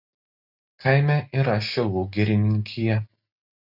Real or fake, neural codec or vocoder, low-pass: real; none; 5.4 kHz